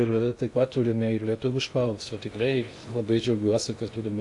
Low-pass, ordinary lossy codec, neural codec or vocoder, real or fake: 10.8 kHz; AAC, 64 kbps; codec, 16 kHz in and 24 kHz out, 0.6 kbps, FocalCodec, streaming, 2048 codes; fake